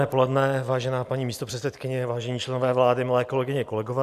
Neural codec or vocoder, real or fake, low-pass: none; real; 14.4 kHz